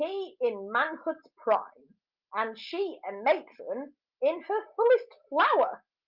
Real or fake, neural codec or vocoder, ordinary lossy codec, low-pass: real; none; Opus, 24 kbps; 5.4 kHz